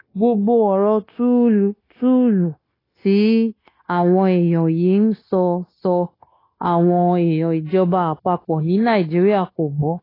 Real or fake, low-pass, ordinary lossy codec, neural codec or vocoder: fake; 5.4 kHz; AAC, 24 kbps; codec, 16 kHz, 0.9 kbps, LongCat-Audio-Codec